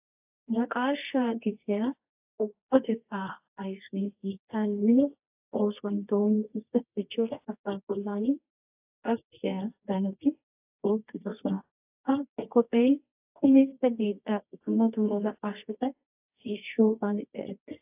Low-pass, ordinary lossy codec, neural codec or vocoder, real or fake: 3.6 kHz; AAC, 32 kbps; codec, 24 kHz, 0.9 kbps, WavTokenizer, medium music audio release; fake